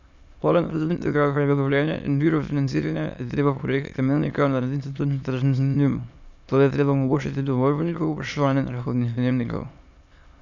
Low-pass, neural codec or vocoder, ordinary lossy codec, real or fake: 7.2 kHz; autoencoder, 22.05 kHz, a latent of 192 numbers a frame, VITS, trained on many speakers; none; fake